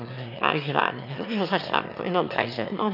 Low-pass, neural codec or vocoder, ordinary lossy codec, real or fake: 5.4 kHz; autoencoder, 22.05 kHz, a latent of 192 numbers a frame, VITS, trained on one speaker; none; fake